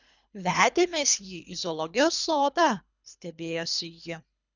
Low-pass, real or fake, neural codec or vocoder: 7.2 kHz; fake; codec, 24 kHz, 3 kbps, HILCodec